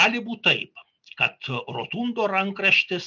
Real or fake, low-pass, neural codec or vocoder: real; 7.2 kHz; none